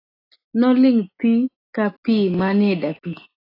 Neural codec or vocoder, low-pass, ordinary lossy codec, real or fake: none; 5.4 kHz; AAC, 24 kbps; real